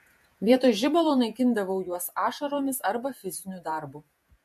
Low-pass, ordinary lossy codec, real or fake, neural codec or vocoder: 14.4 kHz; MP3, 64 kbps; fake; vocoder, 48 kHz, 128 mel bands, Vocos